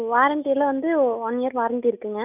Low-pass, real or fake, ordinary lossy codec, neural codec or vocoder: 3.6 kHz; real; none; none